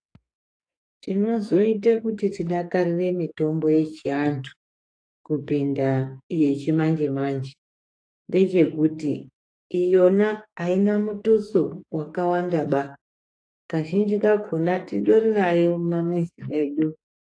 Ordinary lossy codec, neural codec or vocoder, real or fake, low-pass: AAC, 48 kbps; codec, 44.1 kHz, 2.6 kbps, SNAC; fake; 9.9 kHz